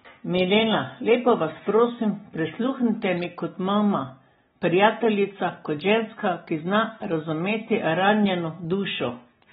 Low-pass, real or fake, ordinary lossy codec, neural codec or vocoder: 7.2 kHz; real; AAC, 16 kbps; none